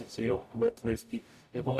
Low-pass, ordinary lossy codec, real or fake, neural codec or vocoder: 14.4 kHz; MP3, 96 kbps; fake; codec, 44.1 kHz, 0.9 kbps, DAC